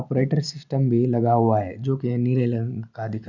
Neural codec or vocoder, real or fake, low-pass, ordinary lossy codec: none; real; 7.2 kHz; none